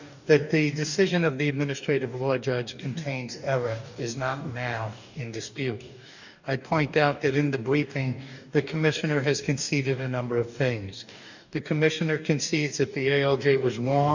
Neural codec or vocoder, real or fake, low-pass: codec, 44.1 kHz, 2.6 kbps, DAC; fake; 7.2 kHz